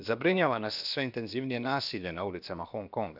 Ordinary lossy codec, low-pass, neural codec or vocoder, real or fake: none; 5.4 kHz; codec, 16 kHz, about 1 kbps, DyCAST, with the encoder's durations; fake